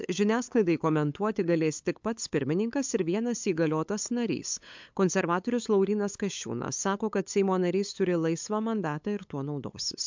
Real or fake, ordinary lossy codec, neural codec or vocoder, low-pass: fake; MP3, 64 kbps; codec, 16 kHz, 8 kbps, FunCodec, trained on LibriTTS, 25 frames a second; 7.2 kHz